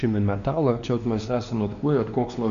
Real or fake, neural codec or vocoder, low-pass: fake; codec, 16 kHz, 2 kbps, X-Codec, WavLM features, trained on Multilingual LibriSpeech; 7.2 kHz